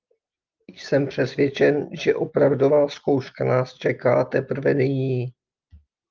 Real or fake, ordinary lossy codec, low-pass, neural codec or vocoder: real; Opus, 24 kbps; 7.2 kHz; none